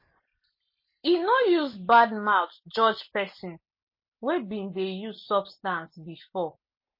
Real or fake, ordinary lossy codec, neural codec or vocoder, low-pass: real; MP3, 24 kbps; none; 5.4 kHz